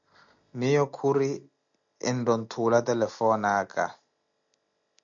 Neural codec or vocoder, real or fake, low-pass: none; real; 7.2 kHz